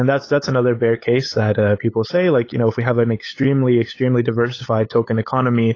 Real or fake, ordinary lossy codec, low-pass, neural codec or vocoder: fake; AAC, 32 kbps; 7.2 kHz; codec, 16 kHz, 8 kbps, FunCodec, trained on LibriTTS, 25 frames a second